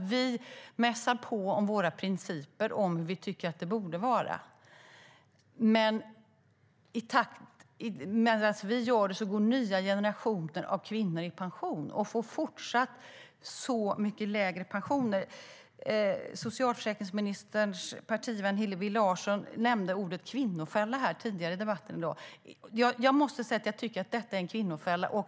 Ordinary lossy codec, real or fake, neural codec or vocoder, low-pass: none; real; none; none